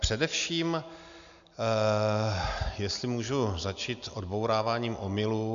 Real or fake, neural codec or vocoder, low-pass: real; none; 7.2 kHz